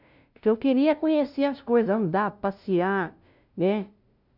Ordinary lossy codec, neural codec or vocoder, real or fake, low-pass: none; codec, 16 kHz, 0.5 kbps, FunCodec, trained on LibriTTS, 25 frames a second; fake; 5.4 kHz